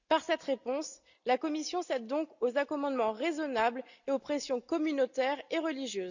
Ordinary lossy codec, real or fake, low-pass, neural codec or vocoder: none; real; 7.2 kHz; none